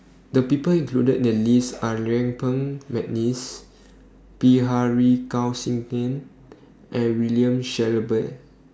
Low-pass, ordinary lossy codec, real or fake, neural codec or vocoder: none; none; real; none